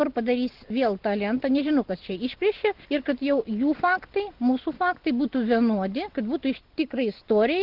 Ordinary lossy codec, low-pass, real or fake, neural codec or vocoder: Opus, 16 kbps; 5.4 kHz; real; none